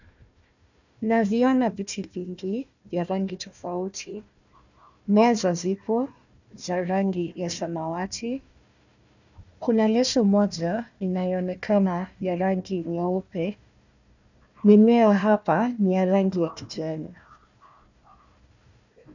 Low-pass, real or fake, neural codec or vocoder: 7.2 kHz; fake; codec, 16 kHz, 1 kbps, FunCodec, trained on Chinese and English, 50 frames a second